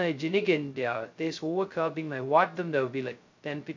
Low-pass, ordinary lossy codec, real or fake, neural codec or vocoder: 7.2 kHz; MP3, 64 kbps; fake; codec, 16 kHz, 0.2 kbps, FocalCodec